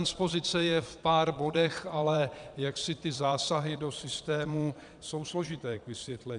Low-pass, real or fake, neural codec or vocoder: 9.9 kHz; fake; vocoder, 22.05 kHz, 80 mel bands, Vocos